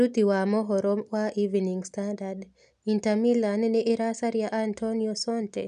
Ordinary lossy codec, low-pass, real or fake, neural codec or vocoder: none; 10.8 kHz; real; none